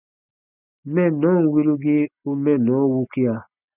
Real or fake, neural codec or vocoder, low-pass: real; none; 3.6 kHz